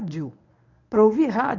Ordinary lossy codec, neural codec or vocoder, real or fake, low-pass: none; codec, 24 kHz, 0.9 kbps, WavTokenizer, medium speech release version 1; fake; 7.2 kHz